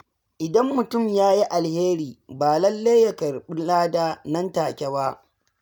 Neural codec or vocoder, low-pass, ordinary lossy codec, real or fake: none; none; none; real